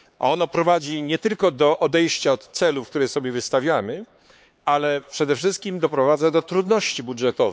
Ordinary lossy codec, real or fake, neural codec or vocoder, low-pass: none; fake; codec, 16 kHz, 4 kbps, X-Codec, HuBERT features, trained on LibriSpeech; none